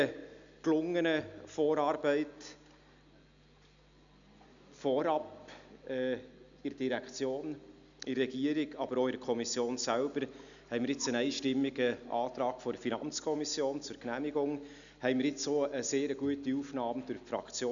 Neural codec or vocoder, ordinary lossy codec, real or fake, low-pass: none; MP3, 96 kbps; real; 7.2 kHz